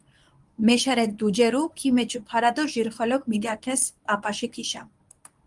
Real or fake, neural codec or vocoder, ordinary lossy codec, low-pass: fake; codec, 24 kHz, 0.9 kbps, WavTokenizer, medium speech release version 1; Opus, 32 kbps; 10.8 kHz